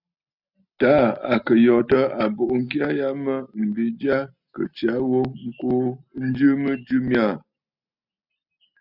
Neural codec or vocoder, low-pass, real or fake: none; 5.4 kHz; real